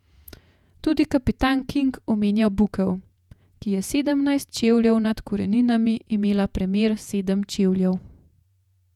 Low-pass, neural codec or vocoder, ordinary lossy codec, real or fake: 19.8 kHz; vocoder, 48 kHz, 128 mel bands, Vocos; none; fake